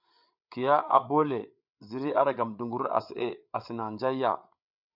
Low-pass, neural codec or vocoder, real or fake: 5.4 kHz; codec, 16 kHz, 8 kbps, FreqCodec, larger model; fake